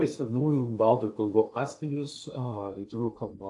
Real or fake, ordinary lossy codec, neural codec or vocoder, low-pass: fake; AAC, 64 kbps; codec, 16 kHz in and 24 kHz out, 0.8 kbps, FocalCodec, streaming, 65536 codes; 10.8 kHz